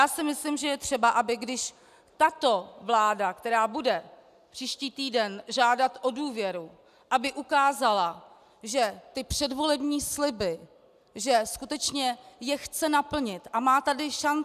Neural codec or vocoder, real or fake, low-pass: none; real; 14.4 kHz